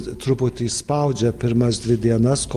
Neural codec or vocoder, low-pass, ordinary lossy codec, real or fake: none; 14.4 kHz; Opus, 24 kbps; real